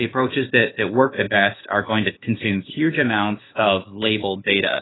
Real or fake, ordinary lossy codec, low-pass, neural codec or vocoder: fake; AAC, 16 kbps; 7.2 kHz; codec, 16 kHz, 0.8 kbps, ZipCodec